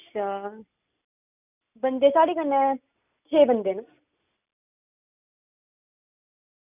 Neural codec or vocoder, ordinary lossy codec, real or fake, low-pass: none; none; real; 3.6 kHz